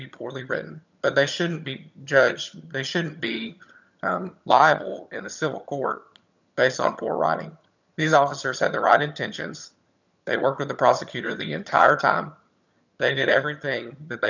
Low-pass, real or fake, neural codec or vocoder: 7.2 kHz; fake; vocoder, 22.05 kHz, 80 mel bands, HiFi-GAN